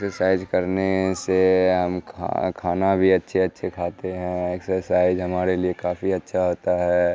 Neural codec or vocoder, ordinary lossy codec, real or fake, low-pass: none; none; real; none